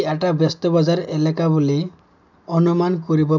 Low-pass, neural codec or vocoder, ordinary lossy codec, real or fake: 7.2 kHz; none; none; real